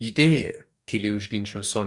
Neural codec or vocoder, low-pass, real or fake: codec, 44.1 kHz, 2.6 kbps, DAC; 10.8 kHz; fake